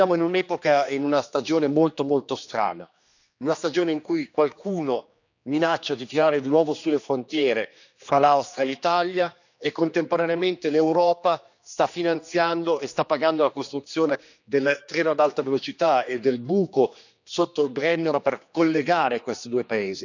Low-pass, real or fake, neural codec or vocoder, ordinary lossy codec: 7.2 kHz; fake; codec, 16 kHz, 2 kbps, X-Codec, HuBERT features, trained on general audio; none